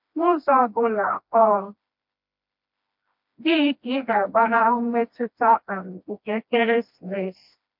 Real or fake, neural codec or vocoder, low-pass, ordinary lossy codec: fake; codec, 16 kHz, 1 kbps, FreqCodec, smaller model; 5.4 kHz; MP3, 48 kbps